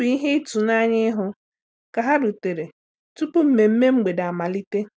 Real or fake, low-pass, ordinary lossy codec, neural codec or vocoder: real; none; none; none